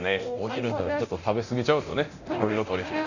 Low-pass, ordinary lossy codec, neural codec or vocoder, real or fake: 7.2 kHz; none; codec, 24 kHz, 0.9 kbps, DualCodec; fake